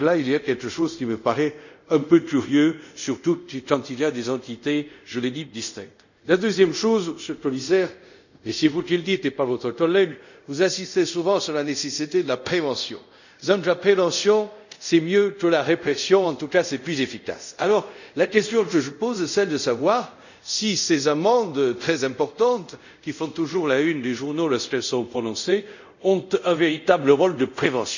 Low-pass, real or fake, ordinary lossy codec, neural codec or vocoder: 7.2 kHz; fake; none; codec, 24 kHz, 0.5 kbps, DualCodec